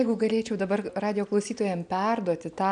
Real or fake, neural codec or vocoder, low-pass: real; none; 9.9 kHz